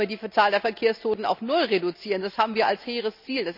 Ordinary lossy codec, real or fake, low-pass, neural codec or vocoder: none; real; 5.4 kHz; none